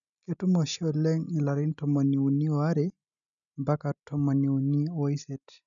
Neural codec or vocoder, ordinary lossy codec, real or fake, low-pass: none; none; real; 7.2 kHz